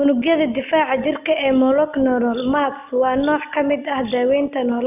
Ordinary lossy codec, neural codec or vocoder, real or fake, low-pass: none; none; real; 3.6 kHz